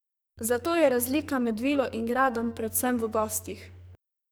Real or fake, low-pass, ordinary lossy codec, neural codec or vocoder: fake; none; none; codec, 44.1 kHz, 2.6 kbps, SNAC